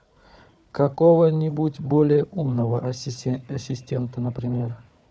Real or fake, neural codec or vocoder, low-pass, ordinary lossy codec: fake; codec, 16 kHz, 4 kbps, FunCodec, trained on Chinese and English, 50 frames a second; none; none